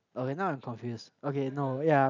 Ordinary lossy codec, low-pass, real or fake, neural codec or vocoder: none; 7.2 kHz; real; none